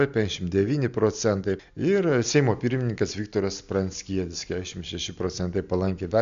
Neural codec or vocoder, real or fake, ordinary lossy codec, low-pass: none; real; MP3, 64 kbps; 7.2 kHz